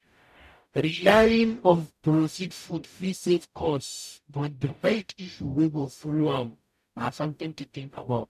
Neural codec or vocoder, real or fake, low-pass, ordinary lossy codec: codec, 44.1 kHz, 0.9 kbps, DAC; fake; 14.4 kHz; none